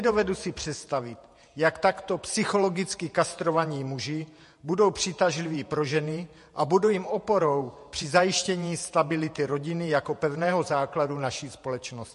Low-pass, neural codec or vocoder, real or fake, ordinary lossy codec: 14.4 kHz; vocoder, 48 kHz, 128 mel bands, Vocos; fake; MP3, 48 kbps